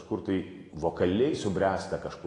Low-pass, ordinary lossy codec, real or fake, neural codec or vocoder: 10.8 kHz; AAC, 32 kbps; real; none